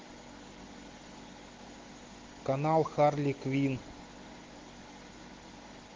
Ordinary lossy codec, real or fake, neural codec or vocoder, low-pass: Opus, 16 kbps; real; none; 7.2 kHz